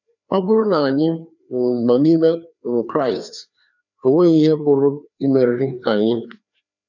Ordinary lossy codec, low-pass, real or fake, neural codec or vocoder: none; 7.2 kHz; fake; codec, 16 kHz, 2 kbps, FreqCodec, larger model